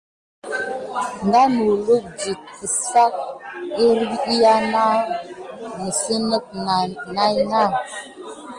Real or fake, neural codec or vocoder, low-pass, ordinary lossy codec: real; none; 9.9 kHz; Opus, 24 kbps